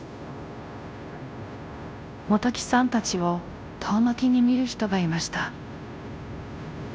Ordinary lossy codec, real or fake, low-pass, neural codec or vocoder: none; fake; none; codec, 16 kHz, 0.5 kbps, FunCodec, trained on Chinese and English, 25 frames a second